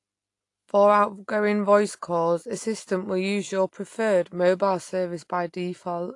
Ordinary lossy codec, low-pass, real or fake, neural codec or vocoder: AAC, 48 kbps; 10.8 kHz; real; none